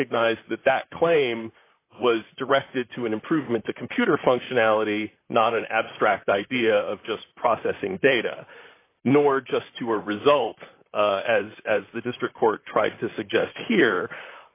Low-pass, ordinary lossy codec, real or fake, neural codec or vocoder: 3.6 kHz; AAC, 24 kbps; fake; vocoder, 44.1 kHz, 128 mel bands, Pupu-Vocoder